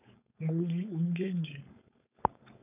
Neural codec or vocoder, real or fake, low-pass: codec, 16 kHz, 4.8 kbps, FACodec; fake; 3.6 kHz